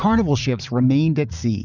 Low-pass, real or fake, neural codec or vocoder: 7.2 kHz; fake; vocoder, 44.1 kHz, 80 mel bands, Vocos